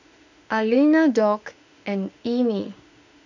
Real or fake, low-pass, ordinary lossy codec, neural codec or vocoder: fake; 7.2 kHz; none; autoencoder, 48 kHz, 32 numbers a frame, DAC-VAE, trained on Japanese speech